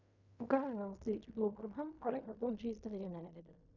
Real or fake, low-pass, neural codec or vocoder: fake; 7.2 kHz; codec, 16 kHz in and 24 kHz out, 0.4 kbps, LongCat-Audio-Codec, fine tuned four codebook decoder